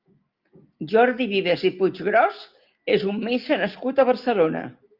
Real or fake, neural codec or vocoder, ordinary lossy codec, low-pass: real; none; Opus, 24 kbps; 5.4 kHz